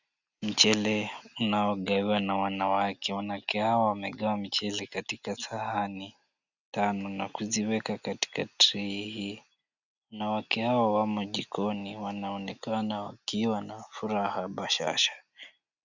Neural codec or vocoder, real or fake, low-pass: none; real; 7.2 kHz